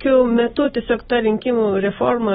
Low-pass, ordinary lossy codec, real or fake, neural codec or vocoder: 19.8 kHz; AAC, 16 kbps; fake; vocoder, 44.1 kHz, 128 mel bands every 256 samples, BigVGAN v2